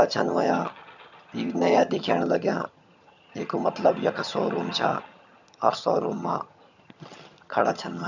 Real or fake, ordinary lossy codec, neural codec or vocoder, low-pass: fake; none; vocoder, 22.05 kHz, 80 mel bands, HiFi-GAN; 7.2 kHz